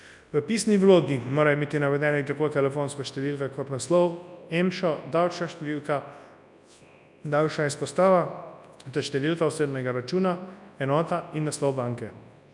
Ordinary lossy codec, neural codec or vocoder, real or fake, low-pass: none; codec, 24 kHz, 0.9 kbps, WavTokenizer, large speech release; fake; 10.8 kHz